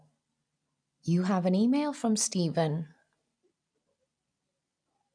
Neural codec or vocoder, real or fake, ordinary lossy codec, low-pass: none; real; none; 9.9 kHz